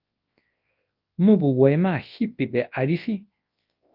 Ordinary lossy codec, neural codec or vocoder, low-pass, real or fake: Opus, 32 kbps; codec, 24 kHz, 0.9 kbps, WavTokenizer, large speech release; 5.4 kHz; fake